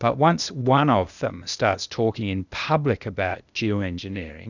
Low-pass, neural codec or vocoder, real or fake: 7.2 kHz; codec, 16 kHz, 0.8 kbps, ZipCodec; fake